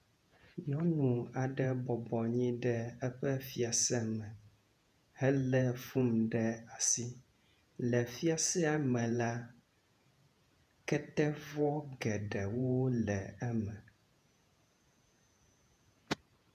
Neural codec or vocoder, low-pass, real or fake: vocoder, 48 kHz, 128 mel bands, Vocos; 14.4 kHz; fake